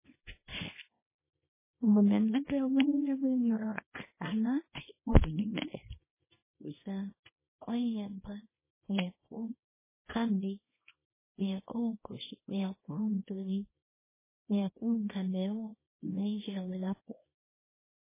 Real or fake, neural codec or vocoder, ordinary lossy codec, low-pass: fake; codec, 24 kHz, 0.9 kbps, WavTokenizer, small release; MP3, 16 kbps; 3.6 kHz